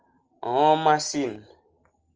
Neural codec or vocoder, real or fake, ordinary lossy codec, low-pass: none; real; Opus, 24 kbps; 7.2 kHz